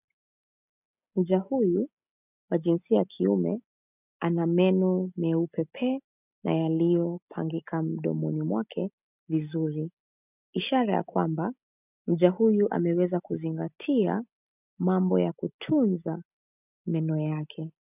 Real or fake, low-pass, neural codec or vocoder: real; 3.6 kHz; none